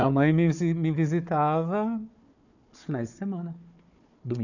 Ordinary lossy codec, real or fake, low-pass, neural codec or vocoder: none; fake; 7.2 kHz; codec, 16 kHz, 4 kbps, FunCodec, trained on Chinese and English, 50 frames a second